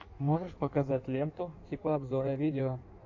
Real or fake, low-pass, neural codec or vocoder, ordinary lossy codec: fake; 7.2 kHz; codec, 16 kHz in and 24 kHz out, 1.1 kbps, FireRedTTS-2 codec; AAC, 48 kbps